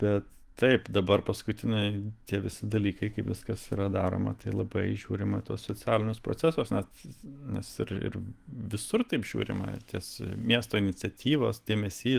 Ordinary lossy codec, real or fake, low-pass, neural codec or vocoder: Opus, 24 kbps; fake; 14.4 kHz; autoencoder, 48 kHz, 128 numbers a frame, DAC-VAE, trained on Japanese speech